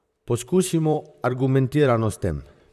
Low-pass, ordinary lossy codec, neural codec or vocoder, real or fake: 14.4 kHz; none; vocoder, 44.1 kHz, 128 mel bands, Pupu-Vocoder; fake